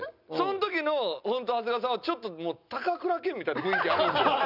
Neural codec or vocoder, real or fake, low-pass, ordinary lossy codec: none; real; 5.4 kHz; none